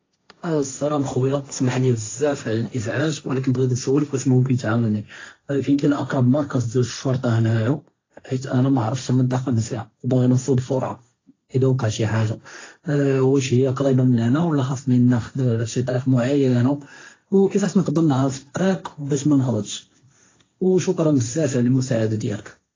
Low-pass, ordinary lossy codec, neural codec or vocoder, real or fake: 7.2 kHz; AAC, 32 kbps; codec, 16 kHz, 1.1 kbps, Voila-Tokenizer; fake